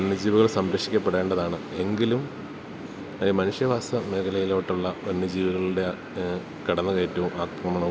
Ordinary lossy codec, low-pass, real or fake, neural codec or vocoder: none; none; real; none